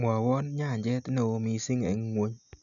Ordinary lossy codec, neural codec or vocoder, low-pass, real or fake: none; none; 7.2 kHz; real